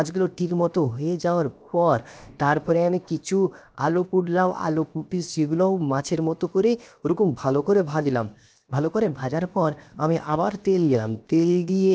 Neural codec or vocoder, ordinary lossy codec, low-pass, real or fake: codec, 16 kHz, 0.7 kbps, FocalCodec; none; none; fake